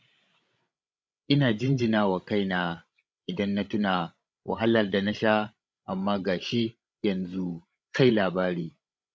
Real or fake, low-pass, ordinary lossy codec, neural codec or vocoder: fake; none; none; codec, 16 kHz, 16 kbps, FreqCodec, larger model